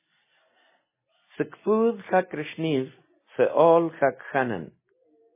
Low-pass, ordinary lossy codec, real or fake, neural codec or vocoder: 3.6 kHz; MP3, 16 kbps; real; none